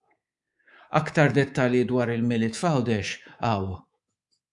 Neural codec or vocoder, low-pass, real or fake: codec, 24 kHz, 3.1 kbps, DualCodec; 10.8 kHz; fake